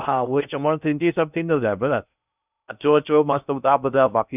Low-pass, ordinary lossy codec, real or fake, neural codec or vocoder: 3.6 kHz; none; fake; codec, 16 kHz in and 24 kHz out, 0.6 kbps, FocalCodec, streaming, 2048 codes